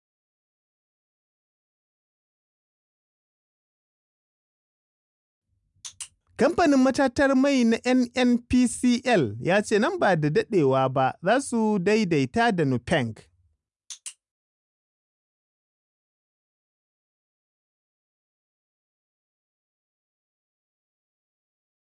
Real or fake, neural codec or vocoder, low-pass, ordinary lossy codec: real; none; 10.8 kHz; none